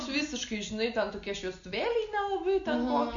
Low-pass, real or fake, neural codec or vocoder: 7.2 kHz; real; none